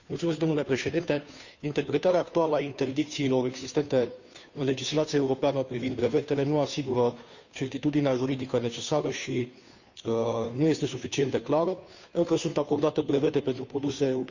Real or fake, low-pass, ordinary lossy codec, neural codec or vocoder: fake; 7.2 kHz; none; codec, 16 kHz, 2 kbps, FunCodec, trained on Chinese and English, 25 frames a second